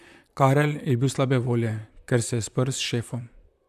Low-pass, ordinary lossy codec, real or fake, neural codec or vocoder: 14.4 kHz; none; fake; vocoder, 44.1 kHz, 128 mel bands, Pupu-Vocoder